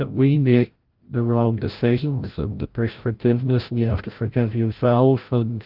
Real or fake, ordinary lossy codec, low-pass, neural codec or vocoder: fake; Opus, 32 kbps; 5.4 kHz; codec, 16 kHz, 0.5 kbps, FreqCodec, larger model